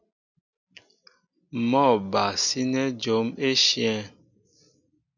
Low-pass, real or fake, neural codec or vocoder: 7.2 kHz; real; none